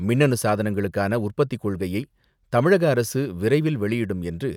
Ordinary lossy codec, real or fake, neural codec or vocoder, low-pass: none; real; none; 19.8 kHz